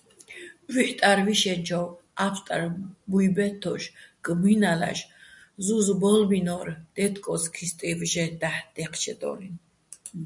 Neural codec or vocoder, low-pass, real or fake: none; 10.8 kHz; real